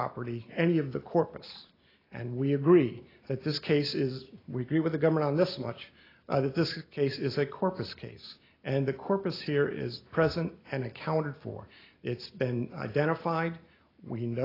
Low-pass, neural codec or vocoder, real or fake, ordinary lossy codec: 5.4 kHz; none; real; AAC, 24 kbps